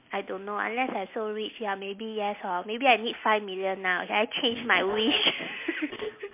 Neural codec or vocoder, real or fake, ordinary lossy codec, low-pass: none; real; MP3, 24 kbps; 3.6 kHz